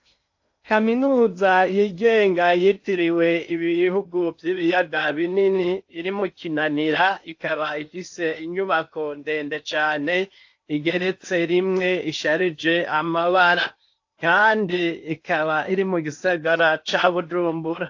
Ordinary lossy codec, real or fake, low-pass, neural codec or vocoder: AAC, 48 kbps; fake; 7.2 kHz; codec, 16 kHz in and 24 kHz out, 0.8 kbps, FocalCodec, streaming, 65536 codes